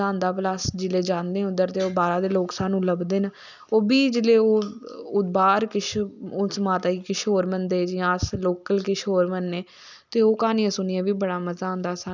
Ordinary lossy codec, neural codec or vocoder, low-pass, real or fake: none; none; 7.2 kHz; real